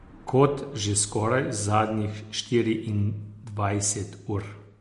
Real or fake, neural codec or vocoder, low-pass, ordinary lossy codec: real; none; 14.4 kHz; MP3, 48 kbps